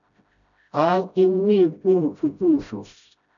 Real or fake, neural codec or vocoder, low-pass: fake; codec, 16 kHz, 0.5 kbps, FreqCodec, smaller model; 7.2 kHz